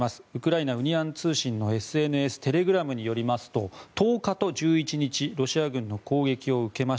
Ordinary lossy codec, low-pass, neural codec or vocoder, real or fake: none; none; none; real